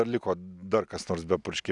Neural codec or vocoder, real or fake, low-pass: none; real; 10.8 kHz